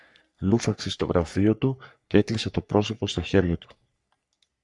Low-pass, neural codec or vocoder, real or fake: 10.8 kHz; codec, 44.1 kHz, 3.4 kbps, Pupu-Codec; fake